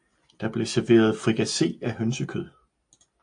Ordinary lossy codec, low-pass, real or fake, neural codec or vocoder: AAC, 64 kbps; 9.9 kHz; real; none